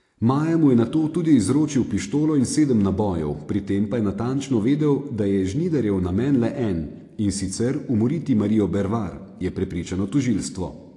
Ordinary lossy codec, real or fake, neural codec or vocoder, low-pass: AAC, 48 kbps; real; none; 10.8 kHz